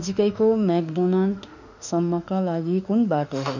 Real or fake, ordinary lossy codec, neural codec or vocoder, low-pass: fake; none; autoencoder, 48 kHz, 32 numbers a frame, DAC-VAE, trained on Japanese speech; 7.2 kHz